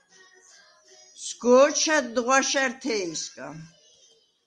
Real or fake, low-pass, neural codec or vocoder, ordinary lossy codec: real; 10.8 kHz; none; Opus, 32 kbps